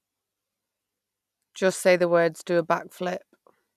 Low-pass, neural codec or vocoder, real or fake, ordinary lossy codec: 14.4 kHz; none; real; none